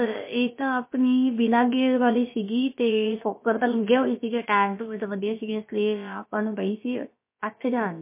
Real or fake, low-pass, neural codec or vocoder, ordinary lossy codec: fake; 3.6 kHz; codec, 16 kHz, about 1 kbps, DyCAST, with the encoder's durations; MP3, 24 kbps